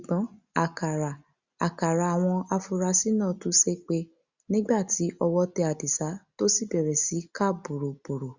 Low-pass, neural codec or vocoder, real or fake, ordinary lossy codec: 7.2 kHz; none; real; Opus, 64 kbps